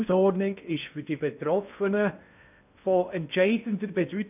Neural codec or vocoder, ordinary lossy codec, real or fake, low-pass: codec, 16 kHz in and 24 kHz out, 0.8 kbps, FocalCodec, streaming, 65536 codes; none; fake; 3.6 kHz